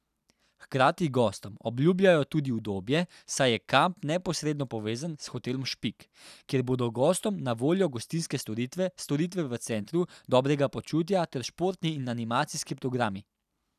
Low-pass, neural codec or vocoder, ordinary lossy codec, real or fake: 14.4 kHz; none; none; real